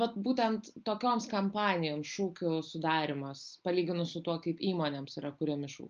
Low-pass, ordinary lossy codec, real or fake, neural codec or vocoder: 7.2 kHz; Opus, 24 kbps; real; none